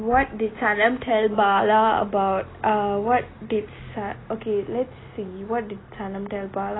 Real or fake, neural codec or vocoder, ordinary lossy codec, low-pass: real; none; AAC, 16 kbps; 7.2 kHz